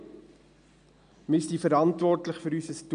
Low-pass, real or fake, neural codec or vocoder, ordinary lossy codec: 9.9 kHz; real; none; none